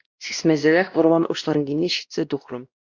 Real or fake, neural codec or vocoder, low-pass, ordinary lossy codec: fake; codec, 16 kHz, 1 kbps, X-Codec, WavLM features, trained on Multilingual LibriSpeech; 7.2 kHz; Opus, 64 kbps